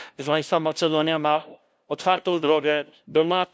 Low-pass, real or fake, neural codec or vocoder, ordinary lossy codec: none; fake; codec, 16 kHz, 0.5 kbps, FunCodec, trained on LibriTTS, 25 frames a second; none